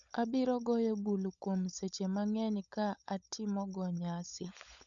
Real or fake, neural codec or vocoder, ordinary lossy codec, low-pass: fake; codec, 16 kHz, 16 kbps, FunCodec, trained on LibriTTS, 50 frames a second; none; 7.2 kHz